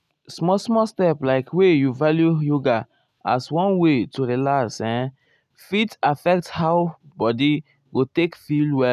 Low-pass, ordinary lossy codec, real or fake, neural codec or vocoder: 14.4 kHz; none; real; none